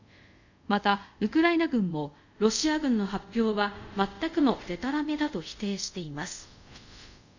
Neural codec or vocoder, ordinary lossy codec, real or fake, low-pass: codec, 24 kHz, 0.5 kbps, DualCodec; none; fake; 7.2 kHz